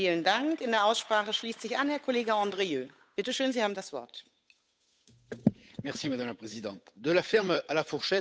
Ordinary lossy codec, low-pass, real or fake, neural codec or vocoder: none; none; fake; codec, 16 kHz, 8 kbps, FunCodec, trained on Chinese and English, 25 frames a second